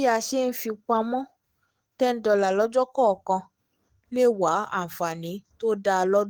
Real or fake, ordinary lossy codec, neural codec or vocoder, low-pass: fake; Opus, 24 kbps; codec, 44.1 kHz, 7.8 kbps, DAC; 19.8 kHz